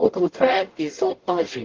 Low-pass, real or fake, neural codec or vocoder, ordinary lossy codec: 7.2 kHz; fake; codec, 44.1 kHz, 0.9 kbps, DAC; Opus, 16 kbps